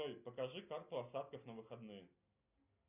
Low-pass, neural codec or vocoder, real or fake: 3.6 kHz; none; real